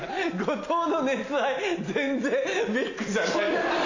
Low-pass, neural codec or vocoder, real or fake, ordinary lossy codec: 7.2 kHz; none; real; AAC, 48 kbps